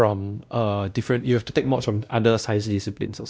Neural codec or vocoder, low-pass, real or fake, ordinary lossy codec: codec, 16 kHz, 1 kbps, X-Codec, WavLM features, trained on Multilingual LibriSpeech; none; fake; none